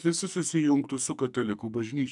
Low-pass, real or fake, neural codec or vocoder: 10.8 kHz; fake; codec, 44.1 kHz, 2.6 kbps, SNAC